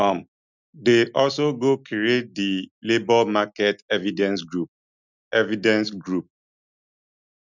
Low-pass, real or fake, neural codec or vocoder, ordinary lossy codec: 7.2 kHz; real; none; none